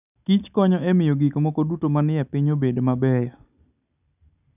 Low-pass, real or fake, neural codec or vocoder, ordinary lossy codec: 3.6 kHz; real; none; none